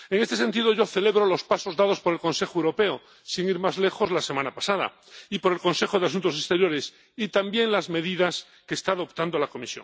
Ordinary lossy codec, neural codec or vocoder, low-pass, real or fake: none; none; none; real